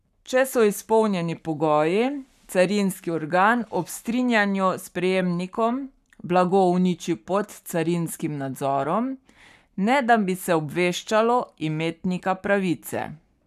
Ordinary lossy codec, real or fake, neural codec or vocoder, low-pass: none; fake; codec, 44.1 kHz, 7.8 kbps, Pupu-Codec; 14.4 kHz